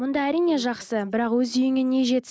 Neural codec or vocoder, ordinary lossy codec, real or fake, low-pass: none; none; real; none